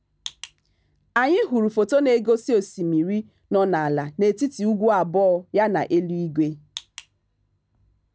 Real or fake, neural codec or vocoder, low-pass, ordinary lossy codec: real; none; none; none